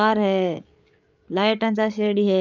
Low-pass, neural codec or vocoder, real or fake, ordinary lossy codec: 7.2 kHz; codec, 16 kHz, 8 kbps, FreqCodec, larger model; fake; none